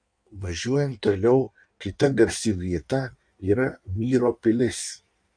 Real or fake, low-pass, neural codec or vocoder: fake; 9.9 kHz; codec, 16 kHz in and 24 kHz out, 1.1 kbps, FireRedTTS-2 codec